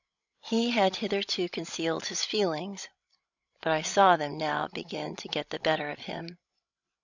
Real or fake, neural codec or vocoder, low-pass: fake; codec, 16 kHz, 16 kbps, FreqCodec, larger model; 7.2 kHz